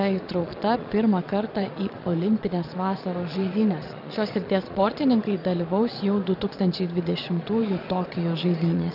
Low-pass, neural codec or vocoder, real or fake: 5.4 kHz; vocoder, 22.05 kHz, 80 mel bands, Vocos; fake